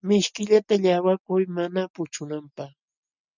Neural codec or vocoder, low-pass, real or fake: none; 7.2 kHz; real